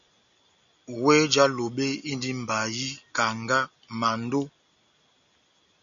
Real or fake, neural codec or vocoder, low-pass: real; none; 7.2 kHz